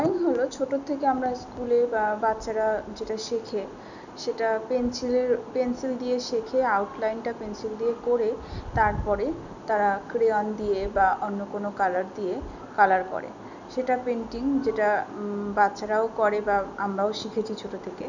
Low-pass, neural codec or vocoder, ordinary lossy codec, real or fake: 7.2 kHz; none; none; real